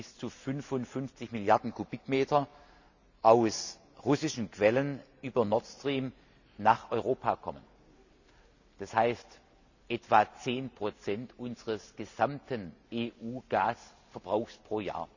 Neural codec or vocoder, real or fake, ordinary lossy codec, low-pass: none; real; none; 7.2 kHz